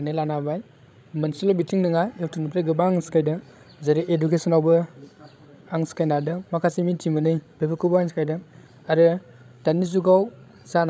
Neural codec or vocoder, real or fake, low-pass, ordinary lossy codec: codec, 16 kHz, 16 kbps, FreqCodec, larger model; fake; none; none